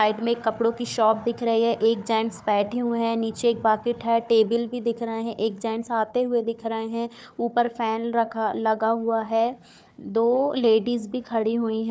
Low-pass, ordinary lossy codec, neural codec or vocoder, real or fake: none; none; codec, 16 kHz, 4 kbps, FunCodec, trained on Chinese and English, 50 frames a second; fake